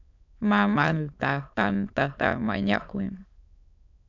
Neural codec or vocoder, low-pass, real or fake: autoencoder, 22.05 kHz, a latent of 192 numbers a frame, VITS, trained on many speakers; 7.2 kHz; fake